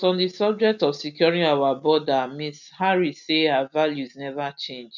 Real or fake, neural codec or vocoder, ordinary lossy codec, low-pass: real; none; none; 7.2 kHz